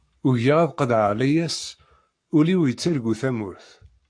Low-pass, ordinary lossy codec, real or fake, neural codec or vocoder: 9.9 kHz; AAC, 64 kbps; fake; codec, 44.1 kHz, 7.8 kbps, Pupu-Codec